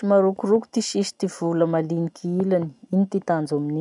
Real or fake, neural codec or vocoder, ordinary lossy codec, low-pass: real; none; none; 10.8 kHz